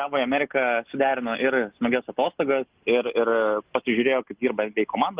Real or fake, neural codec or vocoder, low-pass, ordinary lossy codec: real; none; 3.6 kHz; Opus, 32 kbps